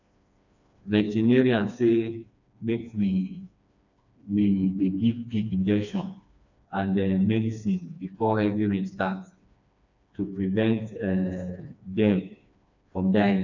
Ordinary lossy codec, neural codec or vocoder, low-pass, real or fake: none; codec, 16 kHz, 2 kbps, FreqCodec, smaller model; 7.2 kHz; fake